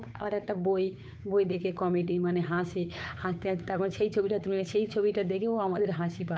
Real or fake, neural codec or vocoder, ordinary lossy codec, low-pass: fake; codec, 16 kHz, 8 kbps, FunCodec, trained on Chinese and English, 25 frames a second; none; none